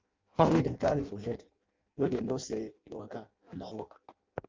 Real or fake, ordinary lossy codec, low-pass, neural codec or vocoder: fake; Opus, 24 kbps; 7.2 kHz; codec, 16 kHz in and 24 kHz out, 0.6 kbps, FireRedTTS-2 codec